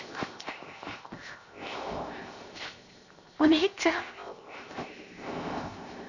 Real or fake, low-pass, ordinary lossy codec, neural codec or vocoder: fake; 7.2 kHz; none; codec, 16 kHz, 0.7 kbps, FocalCodec